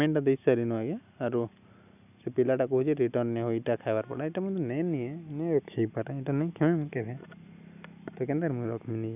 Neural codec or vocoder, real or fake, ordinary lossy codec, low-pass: none; real; none; 3.6 kHz